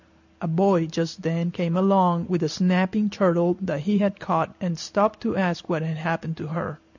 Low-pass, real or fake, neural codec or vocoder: 7.2 kHz; real; none